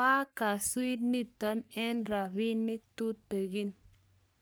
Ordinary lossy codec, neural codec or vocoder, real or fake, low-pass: none; codec, 44.1 kHz, 3.4 kbps, Pupu-Codec; fake; none